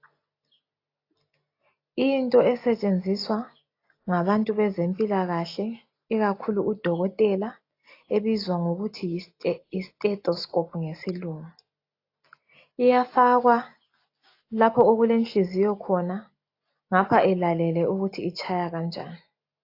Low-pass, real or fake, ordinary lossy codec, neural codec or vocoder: 5.4 kHz; real; AAC, 32 kbps; none